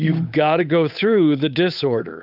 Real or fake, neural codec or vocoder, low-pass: fake; codec, 16 kHz, 8 kbps, FunCodec, trained on Chinese and English, 25 frames a second; 5.4 kHz